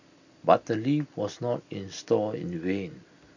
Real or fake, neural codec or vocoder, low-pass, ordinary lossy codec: real; none; 7.2 kHz; none